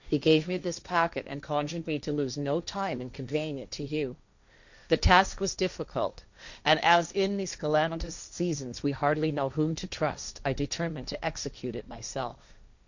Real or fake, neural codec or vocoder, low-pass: fake; codec, 16 kHz, 1.1 kbps, Voila-Tokenizer; 7.2 kHz